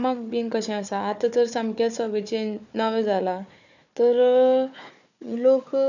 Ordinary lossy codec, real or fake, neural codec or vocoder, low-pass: none; fake; codec, 16 kHz, 4 kbps, FunCodec, trained on Chinese and English, 50 frames a second; 7.2 kHz